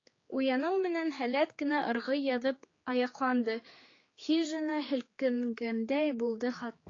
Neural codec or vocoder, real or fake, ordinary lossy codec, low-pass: codec, 16 kHz, 4 kbps, X-Codec, HuBERT features, trained on general audio; fake; AAC, 32 kbps; 7.2 kHz